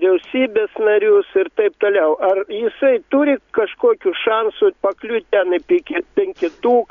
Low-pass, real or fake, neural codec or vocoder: 7.2 kHz; real; none